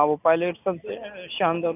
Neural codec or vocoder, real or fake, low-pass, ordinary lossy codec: none; real; 3.6 kHz; none